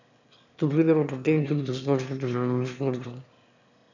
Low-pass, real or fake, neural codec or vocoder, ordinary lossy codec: 7.2 kHz; fake; autoencoder, 22.05 kHz, a latent of 192 numbers a frame, VITS, trained on one speaker; none